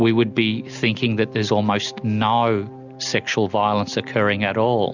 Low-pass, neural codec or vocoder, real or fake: 7.2 kHz; none; real